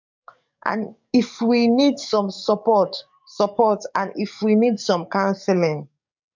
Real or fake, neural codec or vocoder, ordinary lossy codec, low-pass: fake; codec, 44.1 kHz, 7.8 kbps, DAC; MP3, 64 kbps; 7.2 kHz